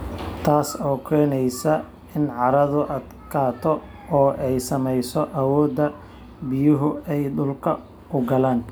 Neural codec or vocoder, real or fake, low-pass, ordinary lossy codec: none; real; none; none